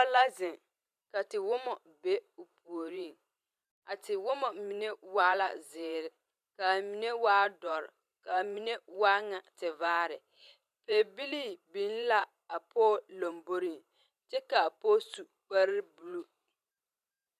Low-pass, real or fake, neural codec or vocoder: 14.4 kHz; fake; vocoder, 44.1 kHz, 128 mel bands every 512 samples, BigVGAN v2